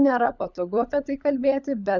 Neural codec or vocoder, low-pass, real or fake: codec, 16 kHz, 16 kbps, FunCodec, trained on LibriTTS, 50 frames a second; 7.2 kHz; fake